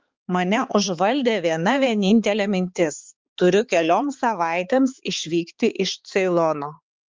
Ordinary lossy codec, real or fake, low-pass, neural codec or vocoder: Opus, 32 kbps; fake; 7.2 kHz; codec, 16 kHz, 4 kbps, X-Codec, HuBERT features, trained on balanced general audio